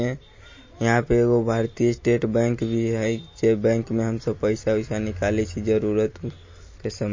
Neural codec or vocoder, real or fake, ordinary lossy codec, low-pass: none; real; MP3, 32 kbps; 7.2 kHz